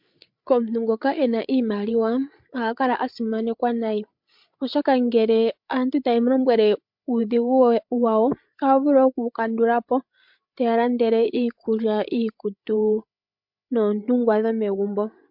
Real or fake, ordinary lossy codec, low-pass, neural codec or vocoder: fake; MP3, 48 kbps; 5.4 kHz; codec, 16 kHz, 8 kbps, FreqCodec, larger model